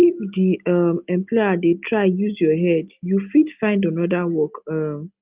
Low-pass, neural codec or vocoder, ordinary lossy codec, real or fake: 3.6 kHz; none; Opus, 32 kbps; real